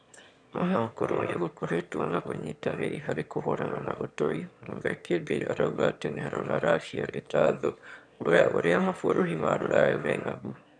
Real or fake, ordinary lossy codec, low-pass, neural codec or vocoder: fake; none; 9.9 kHz; autoencoder, 22.05 kHz, a latent of 192 numbers a frame, VITS, trained on one speaker